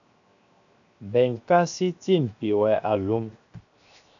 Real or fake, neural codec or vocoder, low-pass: fake; codec, 16 kHz, 0.7 kbps, FocalCodec; 7.2 kHz